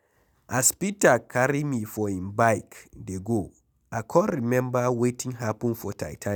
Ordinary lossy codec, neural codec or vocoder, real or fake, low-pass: none; none; real; none